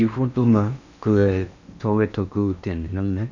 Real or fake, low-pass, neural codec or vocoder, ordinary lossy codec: fake; 7.2 kHz; codec, 16 kHz in and 24 kHz out, 0.8 kbps, FocalCodec, streaming, 65536 codes; none